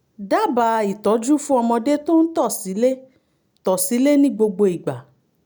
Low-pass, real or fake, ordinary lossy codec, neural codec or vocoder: none; real; none; none